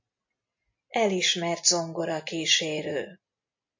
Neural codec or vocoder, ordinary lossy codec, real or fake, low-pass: none; MP3, 48 kbps; real; 7.2 kHz